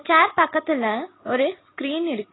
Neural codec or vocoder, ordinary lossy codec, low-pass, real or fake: none; AAC, 16 kbps; 7.2 kHz; real